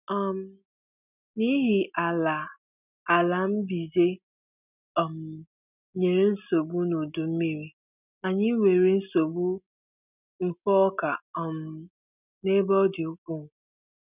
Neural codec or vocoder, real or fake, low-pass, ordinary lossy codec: none; real; 3.6 kHz; none